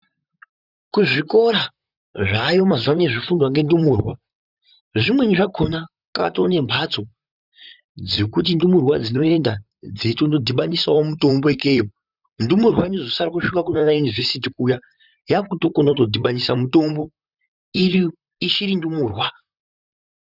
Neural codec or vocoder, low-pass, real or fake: vocoder, 44.1 kHz, 128 mel bands, Pupu-Vocoder; 5.4 kHz; fake